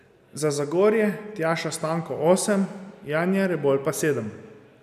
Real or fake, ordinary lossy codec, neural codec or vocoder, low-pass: real; none; none; 14.4 kHz